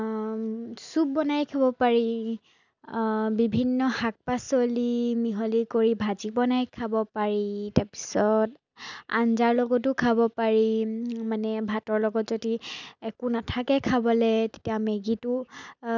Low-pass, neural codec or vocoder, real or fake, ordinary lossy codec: 7.2 kHz; none; real; none